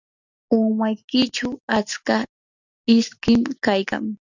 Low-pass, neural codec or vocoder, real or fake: 7.2 kHz; none; real